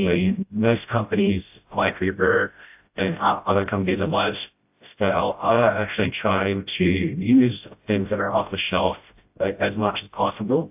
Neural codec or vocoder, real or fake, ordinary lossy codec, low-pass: codec, 16 kHz, 0.5 kbps, FreqCodec, smaller model; fake; AAC, 32 kbps; 3.6 kHz